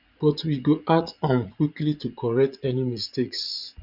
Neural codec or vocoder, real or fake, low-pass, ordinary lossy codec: vocoder, 44.1 kHz, 80 mel bands, Vocos; fake; 5.4 kHz; none